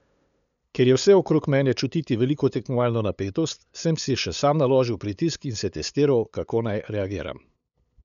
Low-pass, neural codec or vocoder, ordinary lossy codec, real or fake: 7.2 kHz; codec, 16 kHz, 8 kbps, FunCodec, trained on LibriTTS, 25 frames a second; none; fake